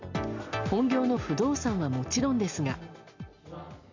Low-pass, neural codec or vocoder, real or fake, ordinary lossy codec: 7.2 kHz; none; real; MP3, 64 kbps